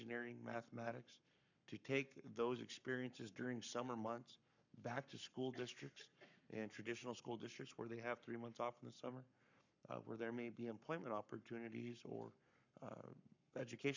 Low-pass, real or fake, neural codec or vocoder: 7.2 kHz; fake; codec, 44.1 kHz, 7.8 kbps, Pupu-Codec